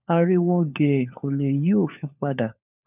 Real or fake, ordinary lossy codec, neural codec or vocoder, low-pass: fake; none; codec, 16 kHz, 4 kbps, FunCodec, trained on LibriTTS, 50 frames a second; 3.6 kHz